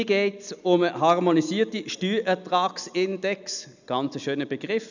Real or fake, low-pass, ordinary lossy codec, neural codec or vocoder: real; 7.2 kHz; none; none